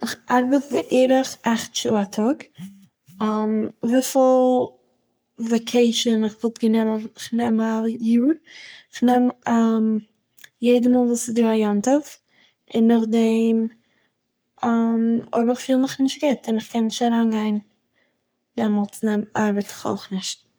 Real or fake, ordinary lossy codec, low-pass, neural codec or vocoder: fake; none; none; codec, 44.1 kHz, 3.4 kbps, Pupu-Codec